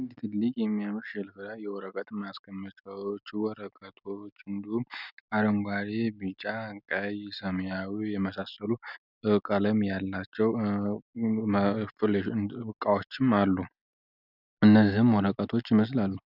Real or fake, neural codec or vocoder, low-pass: real; none; 5.4 kHz